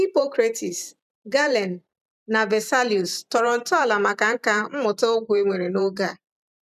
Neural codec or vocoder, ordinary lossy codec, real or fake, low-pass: vocoder, 44.1 kHz, 128 mel bands every 512 samples, BigVGAN v2; none; fake; 14.4 kHz